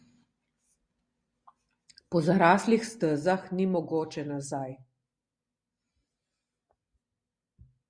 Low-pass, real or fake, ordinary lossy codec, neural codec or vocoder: 9.9 kHz; real; Opus, 64 kbps; none